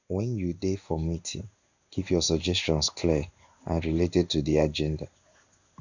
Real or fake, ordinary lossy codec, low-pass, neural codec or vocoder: fake; none; 7.2 kHz; codec, 16 kHz in and 24 kHz out, 1 kbps, XY-Tokenizer